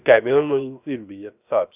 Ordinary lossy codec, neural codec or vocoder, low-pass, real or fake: none; codec, 16 kHz, 0.3 kbps, FocalCodec; 3.6 kHz; fake